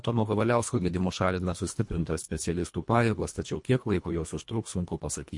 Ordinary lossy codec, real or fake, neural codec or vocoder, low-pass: MP3, 48 kbps; fake; codec, 24 kHz, 1.5 kbps, HILCodec; 10.8 kHz